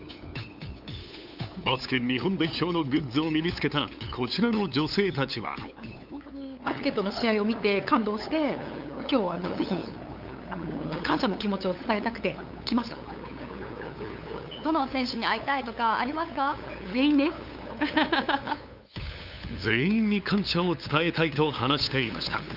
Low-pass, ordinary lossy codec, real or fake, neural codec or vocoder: 5.4 kHz; Opus, 64 kbps; fake; codec, 16 kHz, 8 kbps, FunCodec, trained on LibriTTS, 25 frames a second